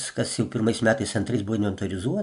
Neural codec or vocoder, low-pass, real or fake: none; 10.8 kHz; real